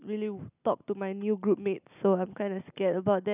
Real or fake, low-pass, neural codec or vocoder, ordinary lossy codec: real; 3.6 kHz; none; none